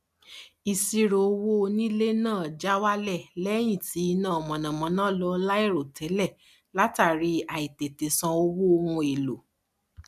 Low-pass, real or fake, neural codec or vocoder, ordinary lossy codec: 14.4 kHz; real; none; MP3, 96 kbps